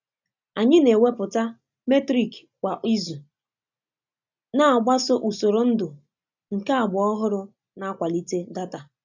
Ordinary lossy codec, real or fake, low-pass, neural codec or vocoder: none; real; 7.2 kHz; none